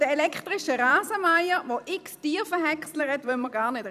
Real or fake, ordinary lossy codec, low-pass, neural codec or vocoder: fake; none; 14.4 kHz; vocoder, 44.1 kHz, 128 mel bands every 256 samples, BigVGAN v2